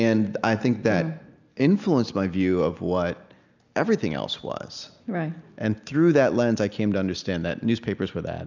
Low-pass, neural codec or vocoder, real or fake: 7.2 kHz; none; real